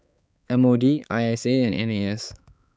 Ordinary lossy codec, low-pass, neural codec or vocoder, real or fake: none; none; codec, 16 kHz, 4 kbps, X-Codec, HuBERT features, trained on balanced general audio; fake